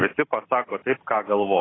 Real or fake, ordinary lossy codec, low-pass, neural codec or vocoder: real; AAC, 16 kbps; 7.2 kHz; none